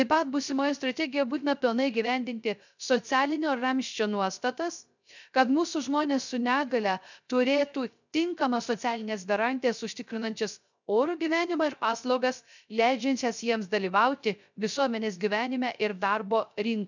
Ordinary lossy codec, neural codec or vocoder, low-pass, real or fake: none; codec, 16 kHz, 0.3 kbps, FocalCodec; 7.2 kHz; fake